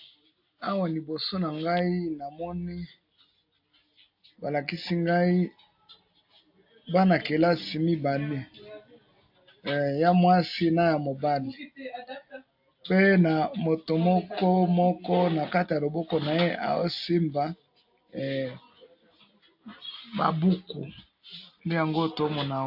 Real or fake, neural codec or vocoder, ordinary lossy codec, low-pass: real; none; AAC, 48 kbps; 5.4 kHz